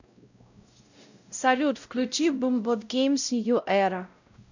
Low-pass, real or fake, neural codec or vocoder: 7.2 kHz; fake; codec, 16 kHz, 0.5 kbps, X-Codec, WavLM features, trained on Multilingual LibriSpeech